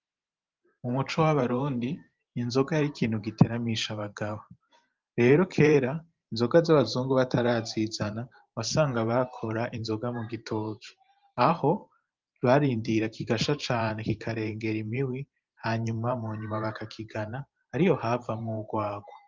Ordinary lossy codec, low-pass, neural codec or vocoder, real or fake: Opus, 24 kbps; 7.2 kHz; vocoder, 44.1 kHz, 128 mel bands every 512 samples, BigVGAN v2; fake